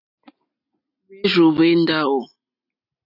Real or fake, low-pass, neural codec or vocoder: real; 5.4 kHz; none